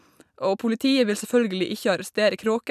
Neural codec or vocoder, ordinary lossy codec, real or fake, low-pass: none; none; real; 14.4 kHz